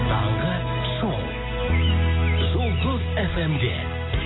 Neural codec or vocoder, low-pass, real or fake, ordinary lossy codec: none; 7.2 kHz; real; AAC, 16 kbps